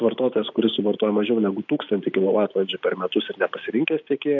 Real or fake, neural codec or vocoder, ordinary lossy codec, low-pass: real; none; MP3, 48 kbps; 7.2 kHz